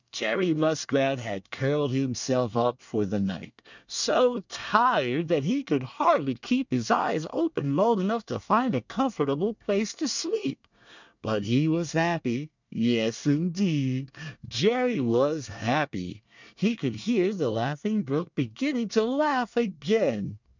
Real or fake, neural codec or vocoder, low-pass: fake; codec, 24 kHz, 1 kbps, SNAC; 7.2 kHz